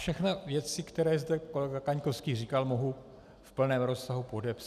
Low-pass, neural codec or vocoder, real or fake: 14.4 kHz; none; real